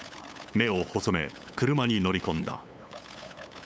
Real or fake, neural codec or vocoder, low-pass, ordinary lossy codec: fake; codec, 16 kHz, 8 kbps, FunCodec, trained on LibriTTS, 25 frames a second; none; none